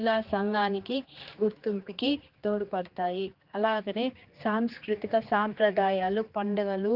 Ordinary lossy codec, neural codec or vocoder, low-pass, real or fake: Opus, 32 kbps; codec, 16 kHz, 2 kbps, X-Codec, HuBERT features, trained on general audio; 5.4 kHz; fake